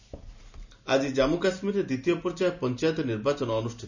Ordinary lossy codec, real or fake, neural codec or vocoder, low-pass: none; real; none; 7.2 kHz